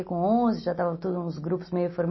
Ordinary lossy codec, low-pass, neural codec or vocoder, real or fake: MP3, 24 kbps; 7.2 kHz; none; real